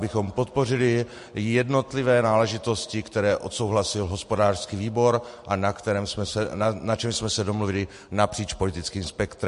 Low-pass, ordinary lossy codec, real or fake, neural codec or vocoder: 14.4 kHz; MP3, 48 kbps; real; none